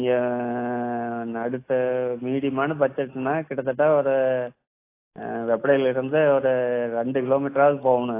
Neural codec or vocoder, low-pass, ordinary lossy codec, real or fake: none; 3.6 kHz; AAC, 24 kbps; real